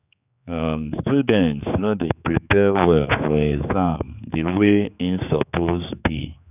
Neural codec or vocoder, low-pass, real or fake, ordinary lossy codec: codec, 16 kHz, 4 kbps, X-Codec, HuBERT features, trained on general audio; 3.6 kHz; fake; none